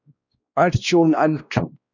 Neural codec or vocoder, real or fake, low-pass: codec, 16 kHz, 1 kbps, X-Codec, WavLM features, trained on Multilingual LibriSpeech; fake; 7.2 kHz